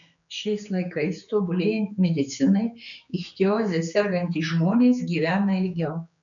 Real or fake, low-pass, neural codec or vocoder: fake; 7.2 kHz; codec, 16 kHz, 4 kbps, X-Codec, HuBERT features, trained on general audio